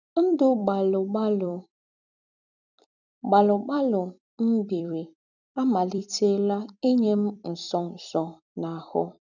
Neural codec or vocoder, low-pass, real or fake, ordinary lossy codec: vocoder, 44.1 kHz, 128 mel bands every 256 samples, BigVGAN v2; 7.2 kHz; fake; none